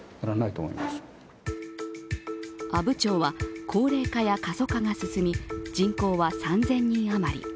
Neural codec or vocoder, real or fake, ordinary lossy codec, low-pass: none; real; none; none